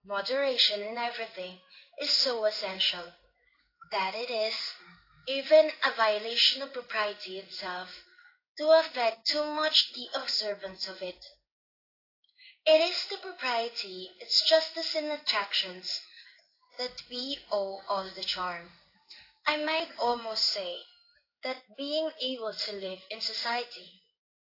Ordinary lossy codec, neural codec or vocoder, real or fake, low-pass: AAC, 32 kbps; codec, 16 kHz in and 24 kHz out, 1 kbps, XY-Tokenizer; fake; 5.4 kHz